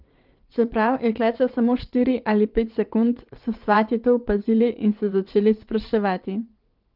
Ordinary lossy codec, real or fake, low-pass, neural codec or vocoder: Opus, 32 kbps; fake; 5.4 kHz; vocoder, 22.05 kHz, 80 mel bands, WaveNeXt